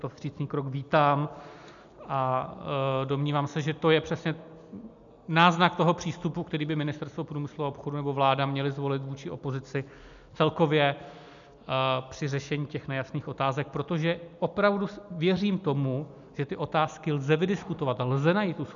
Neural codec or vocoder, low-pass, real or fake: none; 7.2 kHz; real